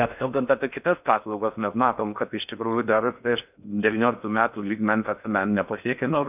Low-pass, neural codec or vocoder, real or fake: 3.6 kHz; codec, 16 kHz in and 24 kHz out, 0.6 kbps, FocalCodec, streaming, 4096 codes; fake